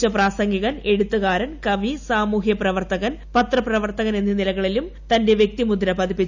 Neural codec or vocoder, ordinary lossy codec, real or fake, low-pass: none; none; real; 7.2 kHz